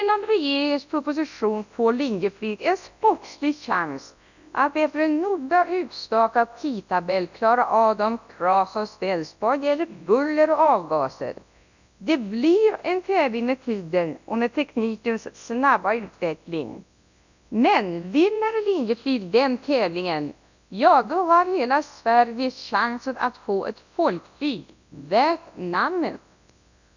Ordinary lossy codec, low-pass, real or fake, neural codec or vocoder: none; 7.2 kHz; fake; codec, 24 kHz, 0.9 kbps, WavTokenizer, large speech release